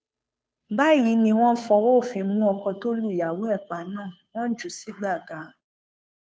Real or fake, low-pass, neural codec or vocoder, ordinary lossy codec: fake; none; codec, 16 kHz, 2 kbps, FunCodec, trained on Chinese and English, 25 frames a second; none